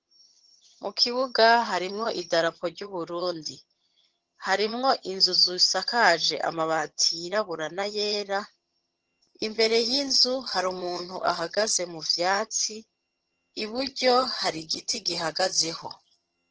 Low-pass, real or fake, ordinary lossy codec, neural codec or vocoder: 7.2 kHz; fake; Opus, 16 kbps; vocoder, 22.05 kHz, 80 mel bands, HiFi-GAN